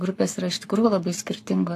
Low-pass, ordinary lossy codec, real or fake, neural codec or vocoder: 14.4 kHz; AAC, 64 kbps; fake; codec, 44.1 kHz, 7.8 kbps, Pupu-Codec